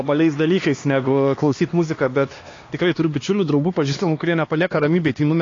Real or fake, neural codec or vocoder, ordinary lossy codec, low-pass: fake; codec, 16 kHz, 2 kbps, X-Codec, HuBERT features, trained on LibriSpeech; AAC, 32 kbps; 7.2 kHz